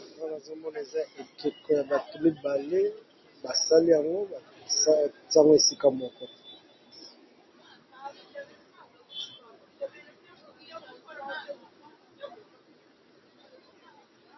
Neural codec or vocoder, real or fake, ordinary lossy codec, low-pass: none; real; MP3, 24 kbps; 7.2 kHz